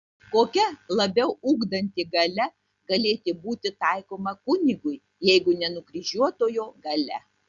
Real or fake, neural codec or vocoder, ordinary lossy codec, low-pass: real; none; Opus, 64 kbps; 7.2 kHz